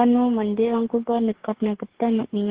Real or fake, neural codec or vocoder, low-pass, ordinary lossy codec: fake; codec, 44.1 kHz, 2.6 kbps, DAC; 3.6 kHz; Opus, 16 kbps